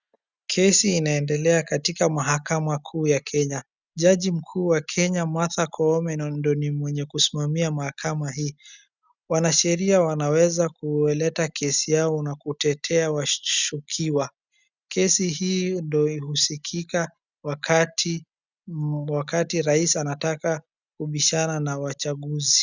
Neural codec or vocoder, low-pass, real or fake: none; 7.2 kHz; real